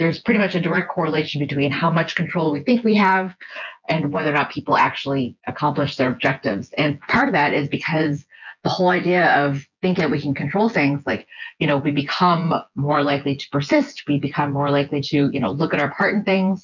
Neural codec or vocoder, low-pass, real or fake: vocoder, 24 kHz, 100 mel bands, Vocos; 7.2 kHz; fake